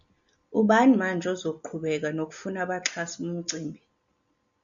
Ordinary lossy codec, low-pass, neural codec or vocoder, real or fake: MP3, 96 kbps; 7.2 kHz; none; real